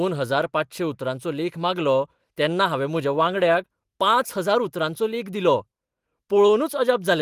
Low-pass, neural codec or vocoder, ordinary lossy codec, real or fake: 14.4 kHz; none; Opus, 24 kbps; real